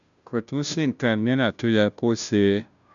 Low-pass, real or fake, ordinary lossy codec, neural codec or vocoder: 7.2 kHz; fake; none; codec, 16 kHz, 0.5 kbps, FunCodec, trained on Chinese and English, 25 frames a second